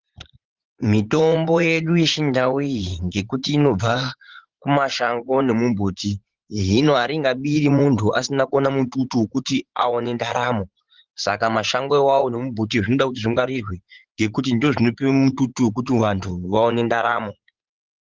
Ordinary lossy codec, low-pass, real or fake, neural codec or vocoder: Opus, 16 kbps; 7.2 kHz; fake; vocoder, 44.1 kHz, 80 mel bands, Vocos